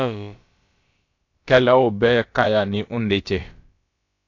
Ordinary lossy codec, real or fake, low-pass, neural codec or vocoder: AAC, 48 kbps; fake; 7.2 kHz; codec, 16 kHz, about 1 kbps, DyCAST, with the encoder's durations